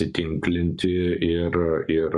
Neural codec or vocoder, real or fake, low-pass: codec, 44.1 kHz, 7.8 kbps, Pupu-Codec; fake; 10.8 kHz